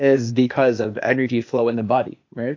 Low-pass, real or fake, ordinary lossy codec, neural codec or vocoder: 7.2 kHz; fake; AAC, 48 kbps; codec, 16 kHz, 0.8 kbps, ZipCodec